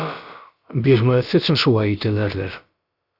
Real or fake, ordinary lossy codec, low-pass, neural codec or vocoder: fake; Opus, 64 kbps; 5.4 kHz; codec, 16 kHz, about 1 kbps, DyCAST, with the encoder's durations